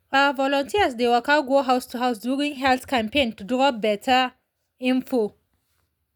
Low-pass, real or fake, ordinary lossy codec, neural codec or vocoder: none; real; none; none